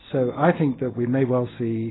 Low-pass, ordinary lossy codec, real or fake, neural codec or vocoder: 7.2 kHz; AAC, 16 kbps; real; none